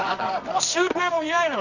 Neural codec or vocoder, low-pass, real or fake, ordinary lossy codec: codec, 24 kHz, 0.9 kbps, WavTokenizer, medium music audio release; 7.2 kHz; fake; none